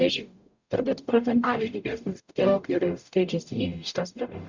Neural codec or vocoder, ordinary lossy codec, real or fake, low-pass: codec, 44.1 kHz, 0.9 kbps, DAC; Opus, 64 kbps; fake; 7.2 kHz